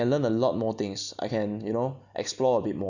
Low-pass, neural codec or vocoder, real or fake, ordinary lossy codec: 7.2 kHz; none; real; none